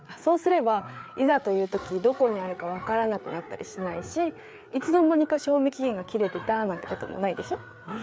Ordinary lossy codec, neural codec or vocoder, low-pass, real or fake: none; codec, 16 kHz, 4 kbps, FreqCodec, larger model; none; fake